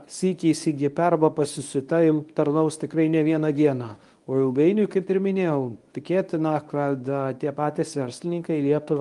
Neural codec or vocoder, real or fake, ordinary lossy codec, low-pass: codec, 24 kHz, 0.9 kbps, WavTokenizer, medium speech release version 1; fake; Opus, 32 kbps; 10.8 kHz